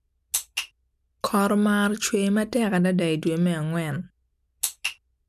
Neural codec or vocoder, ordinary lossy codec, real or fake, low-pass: none; none; real; 14.4 kHz